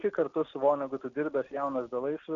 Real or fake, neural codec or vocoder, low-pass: real; none; 7.2 kHz